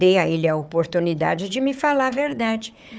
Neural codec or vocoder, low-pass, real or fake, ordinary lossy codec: codec, 16 kHz, 4 kbps, FunCodec, trained on Chinese and English, 50 frames a second; none; fake; none